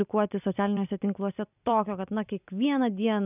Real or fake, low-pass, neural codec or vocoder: fake; 3.6 kHz; vocoder, 44.1 kHz, 128 mel bands every 256 samples, BigVGAN v2